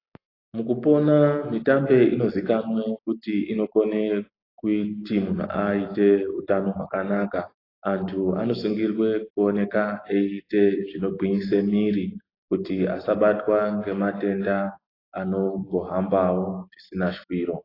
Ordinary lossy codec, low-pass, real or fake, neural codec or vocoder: AAC, 24 kbps; 5.4 kHz; real; none